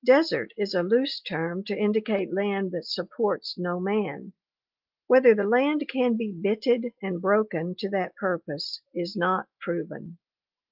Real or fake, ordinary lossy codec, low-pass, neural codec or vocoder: real; Opus, 32 kbps; 5.4 kHz; none